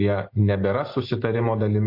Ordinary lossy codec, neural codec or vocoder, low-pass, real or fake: MP3, 32 kbps; none; 5.4 kHz; real